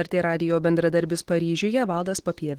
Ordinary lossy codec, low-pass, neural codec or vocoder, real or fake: Opus, 16 kbps; 19.8 kHz; autoencoder, 48 kHz, 128 numbers a frame, DAC-VAE, trained on Japanese speech; fake